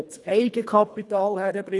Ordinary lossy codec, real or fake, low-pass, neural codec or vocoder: none; fake; none; codec, 24 kHz, 1.5 kbps, HILCodec